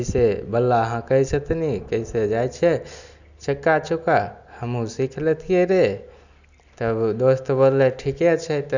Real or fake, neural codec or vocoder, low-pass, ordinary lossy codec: real; none; 7.2 kHz; none